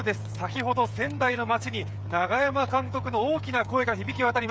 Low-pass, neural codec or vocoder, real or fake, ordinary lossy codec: none; codec, 16 kHz, 8 kbps, FreqCodec, smaller model; fake; none